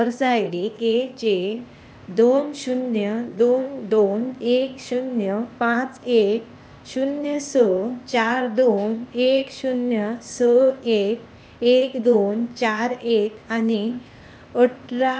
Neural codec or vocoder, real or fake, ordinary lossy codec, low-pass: codec, 16 kHz, 0.8 kbps, ZipCodec; fake; none; none